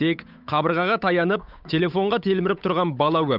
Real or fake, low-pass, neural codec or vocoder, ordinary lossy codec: fake; 5.4 kHz; codec, 16 kHz, 8 kbps, FunCodec, trained on Chinese and English, 25 frames a second; none